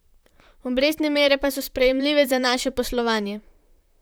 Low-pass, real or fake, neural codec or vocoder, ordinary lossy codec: none; fake; vocoder, 44.1 kHz, 128 mel bands, Pupu-Vocoder; none